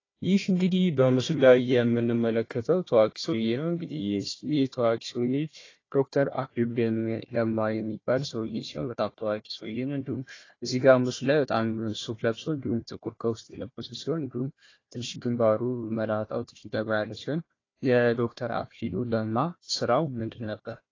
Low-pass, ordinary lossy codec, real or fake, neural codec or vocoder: 7.2 kHz; AAC, 32 kbps; fake; codec, 16 kHz, 1 kbps, FunCodec, trained on Chinese and English, 50 frames a second